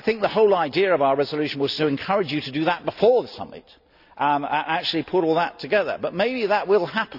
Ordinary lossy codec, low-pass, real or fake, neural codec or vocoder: none; 5.4 kHz; real; none